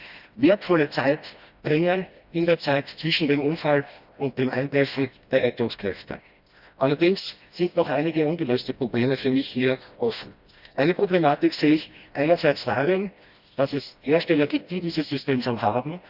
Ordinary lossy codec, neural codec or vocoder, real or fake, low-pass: Opus, 64 kbps; codec, 16 kHz, 1 kbps, FreqCodec, smaller model; fake; 5.4 kHz